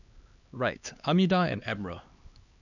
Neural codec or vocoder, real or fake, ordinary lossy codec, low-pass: codec, 16 kHz, 2 kbps, X-Codec, HuBERT features, trained on LibriSpeech; fake; none; 7.2 kHz